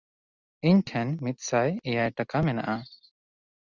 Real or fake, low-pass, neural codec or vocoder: real; 7.2 kHz; none